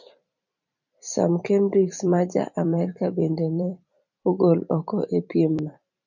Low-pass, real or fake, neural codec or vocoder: 7.2 kHz; fake; vocoder, 44.1 kHz, 128 mel bands every 256 samples, BigVGAN v2